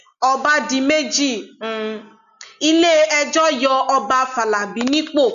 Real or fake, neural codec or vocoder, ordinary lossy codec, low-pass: real; none; none; 7.2 kHz